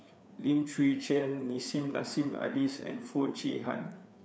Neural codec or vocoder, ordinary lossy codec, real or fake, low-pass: codec, 16 kHz, 4 kbps, FreqCodec, larger model; none; fake; none